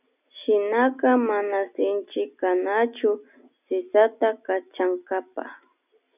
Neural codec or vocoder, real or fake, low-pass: none; real; 3.6 kHz